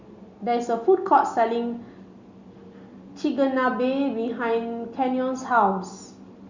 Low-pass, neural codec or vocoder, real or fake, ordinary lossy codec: 7.2 kHz; none; real; Opus, 64 kbps